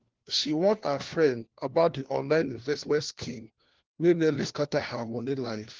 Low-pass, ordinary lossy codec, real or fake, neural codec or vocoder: 7.2 kHz; Opus, 16 kbps; fake; codec, 16 kHz, 1 kbps, FunCodec, trained on LibriTTS, 50 frames a second